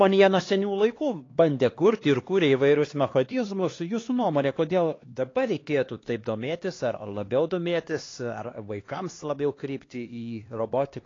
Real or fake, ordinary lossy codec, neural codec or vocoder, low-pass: fake; AAC, 32 kbps; codec, 16 kHz, 2 kbps, X-Codec, HuBERT features, trained on LibriSpeech; 7.2 kHz